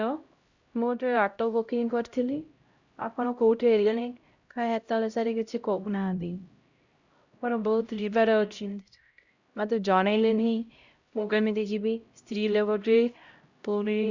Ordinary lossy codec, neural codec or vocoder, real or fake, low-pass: Opus, 64 kbps; codec, 16 kHz, 0.5 kbps, X-Codec, HuBERT features, trained on LibriSpeech; fake; 7.2 kHz